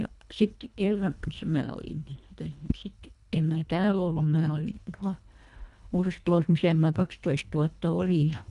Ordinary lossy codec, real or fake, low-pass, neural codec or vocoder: none; fake; 10.8 kHz; codec, 24 kHz, 1.5 kbps, HILCodec